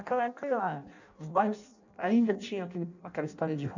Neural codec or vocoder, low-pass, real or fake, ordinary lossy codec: codec, 16 kHz in and 24 kHz out, 0.6 kbps, FireRedTTS-2 codec; 7.2 kHz; fake; none